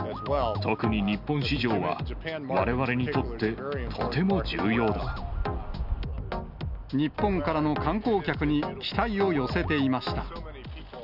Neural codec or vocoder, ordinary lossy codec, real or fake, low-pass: none; none; real; 5.4 kHz